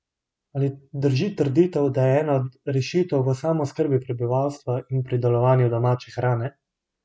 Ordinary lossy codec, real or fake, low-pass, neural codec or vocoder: none; real; none; none